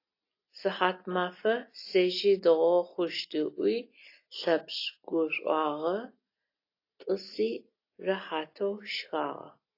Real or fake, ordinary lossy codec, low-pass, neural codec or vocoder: real; AAC, 32 kbps; 5.4 kHz; none